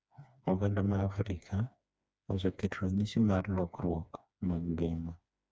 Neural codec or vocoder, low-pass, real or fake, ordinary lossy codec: codec, 16 kHz, 2 kbps, FreqCodec, smaller model; none; fake; none